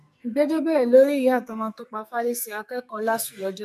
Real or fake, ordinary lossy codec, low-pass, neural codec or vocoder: fake; none; 14.4 kHz; codec, 44.1 kHz, 2.6 kbps, SNAC